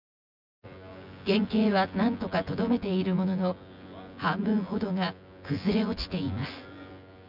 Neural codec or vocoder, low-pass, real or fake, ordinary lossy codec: vocoder, 24 kHz, 100 mel bands, Vocos; 5.4 kHz; fake; none